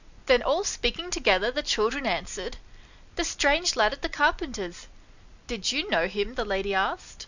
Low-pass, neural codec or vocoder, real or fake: 7.2 kHz; none; real